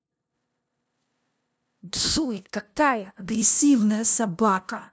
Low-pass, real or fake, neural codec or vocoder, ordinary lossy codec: none; fake; codec, 16 kHz, 0.5 kbps, FunCodec, trained on LibriTTS, 25 frames a second; none